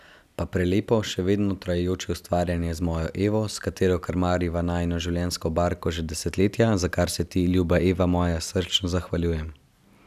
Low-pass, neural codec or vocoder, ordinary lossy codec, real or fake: 14.4 kHz; none; none; real